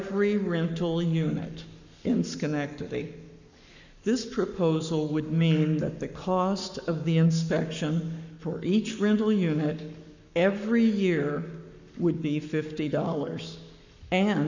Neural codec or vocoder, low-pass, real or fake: codec, 44.1 kHz, 7.8 kbps, Pupu-Codec; 7.2 kHz; fake